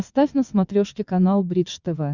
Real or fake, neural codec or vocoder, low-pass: fake; vocoder, 22.05 kHz, 80 mel bands, WaveNeXt; 7.2 kHz